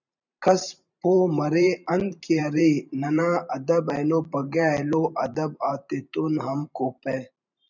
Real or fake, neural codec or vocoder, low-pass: fake; vocoder, 44.1 kHz, 128 mel bands every 512 samples, BigVGAN v2; 7.2 kHz